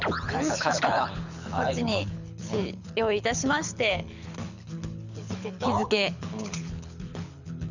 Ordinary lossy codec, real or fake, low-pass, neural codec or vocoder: none; fake; 7.2 kHz; codec, 24 kHz, 6 kbps, HILCodec